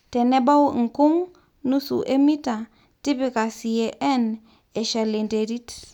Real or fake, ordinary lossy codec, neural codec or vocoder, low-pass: real; none; none; 19.8 kHz